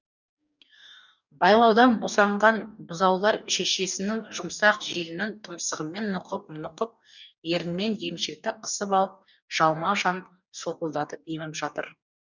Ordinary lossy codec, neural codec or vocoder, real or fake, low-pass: none; codec, 44.1 kHz, 2.6 kbps, DAC; fake; 7.2 kHz